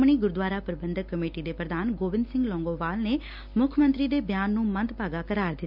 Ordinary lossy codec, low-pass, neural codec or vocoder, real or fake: none; 5.4 kHz; none; real